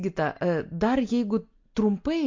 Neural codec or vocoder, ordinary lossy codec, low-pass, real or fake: none; MP3, 48 kbps; 7.2 kHz; real